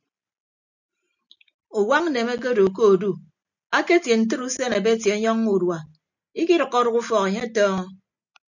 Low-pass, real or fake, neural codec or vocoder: 7.2 kHz; real; none